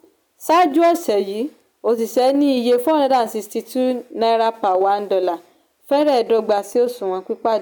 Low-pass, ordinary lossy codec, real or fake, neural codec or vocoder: none; none; real; none